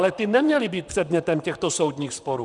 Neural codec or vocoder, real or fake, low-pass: vocoder, 44.1 kHz, 128 mel bands, Pupu-Vocoder; fake; 10.8 kHz